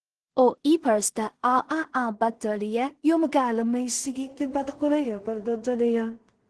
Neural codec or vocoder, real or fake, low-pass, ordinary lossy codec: codec, 16 kHz in and 24 kHz out, 0.4 kbps, LongCat-Audio-Codec, two codebook decoder; fake; 10.8 kHz; Opus, 16 kbps